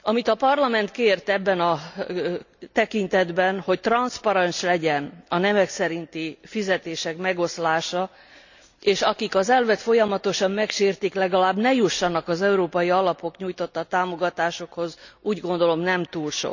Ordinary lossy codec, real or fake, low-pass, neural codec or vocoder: none; real; 7.2 kHz; none